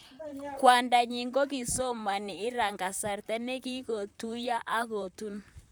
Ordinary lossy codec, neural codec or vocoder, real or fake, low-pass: none; vocoder, 44.1 kHz, 128 mel bands, Pupu-Vocoder; fake; none